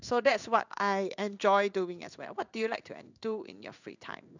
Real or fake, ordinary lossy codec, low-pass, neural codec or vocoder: fake; none; 7.2 kHz; codec, 16 kHz in and 24 kHz out, 1 kbps, XY-Tokenizer